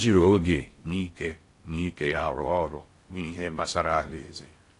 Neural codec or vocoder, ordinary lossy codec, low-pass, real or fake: codec, 16 kHz in and 24 kHz out, 0.6 kbps, FocalCodec, streaming, 4096 codes; AAC, 48 kbps; 10.8 kHz; fake